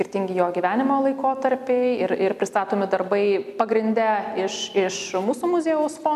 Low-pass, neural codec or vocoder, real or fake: 14.4 kHz; none; real